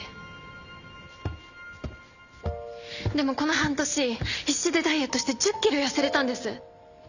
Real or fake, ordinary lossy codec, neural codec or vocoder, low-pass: real; none; none; 7.2 kHz